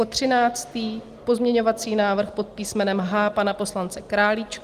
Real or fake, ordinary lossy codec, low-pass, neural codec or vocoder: real; Opus, 24 kbps; 14.4 kHz; none